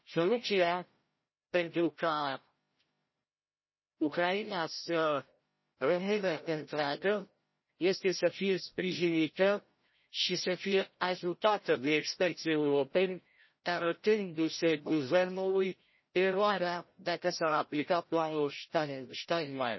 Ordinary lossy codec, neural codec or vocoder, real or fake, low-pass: MP3, 24 kbps; codec, 16 kHz, 0.5 kbps, FreqCodec, larger model; fake; 7.2 kHz